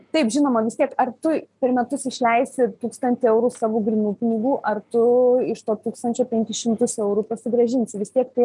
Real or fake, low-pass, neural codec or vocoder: real; 10.8 kHz; none